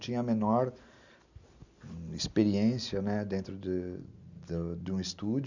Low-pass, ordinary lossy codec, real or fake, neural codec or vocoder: 7.2 kHz; none; real; none